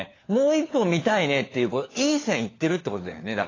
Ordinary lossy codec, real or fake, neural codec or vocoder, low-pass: AAC, 32 kbps; fake; codec, 16 kHz, 4 kbps, FunCodec, trained on LibriTTS, 50 frames a second; 7.2 kHz